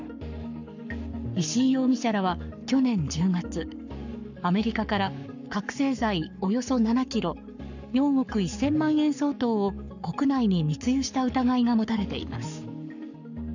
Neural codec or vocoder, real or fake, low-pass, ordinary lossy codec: codec, 44.1 kHz, 7.8 kbps, Pupu-Codec; fake; 7.2 kHz; none